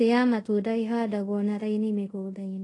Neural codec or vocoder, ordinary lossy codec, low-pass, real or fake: codec, 24 kHz, 0.5 kbps, DualCodec; AAC, 32 kbps; 10.8 kHz; fake